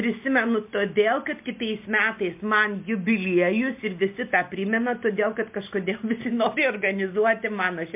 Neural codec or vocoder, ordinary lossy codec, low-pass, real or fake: none; MP3, 32 kbps; 3.6 kHz; real